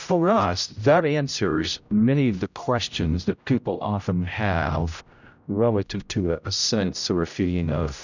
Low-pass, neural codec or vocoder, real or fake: 7.2 kHz; codec, 16 kHz, 0.5 kbps, X-Codec, HuBERT features, trained on general audio; fake